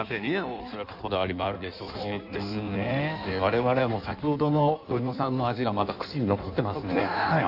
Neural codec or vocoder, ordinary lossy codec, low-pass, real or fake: codec, 16 kHz in and 24 kHz out, 1.1 kbps, FireRedTTS-2 codec; none; 5.4 kHz; fake